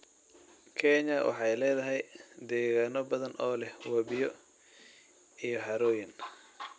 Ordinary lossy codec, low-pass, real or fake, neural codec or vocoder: none; none; real; none